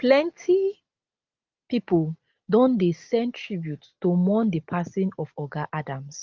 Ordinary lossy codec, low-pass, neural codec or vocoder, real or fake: Opus, 32 kbps; 7.2 kHz; none; real